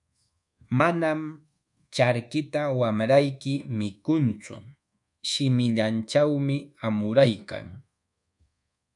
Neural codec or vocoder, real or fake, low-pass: codec, 24 kHz, 1.2 kbps, DualCodec; fake; 10.8 kHz